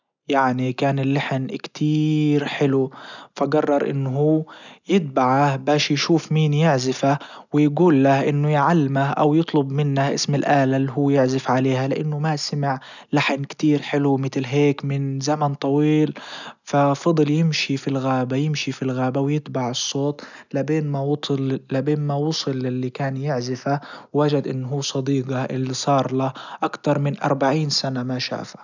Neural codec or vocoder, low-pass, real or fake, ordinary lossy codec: none; 7.2 kHz; real; none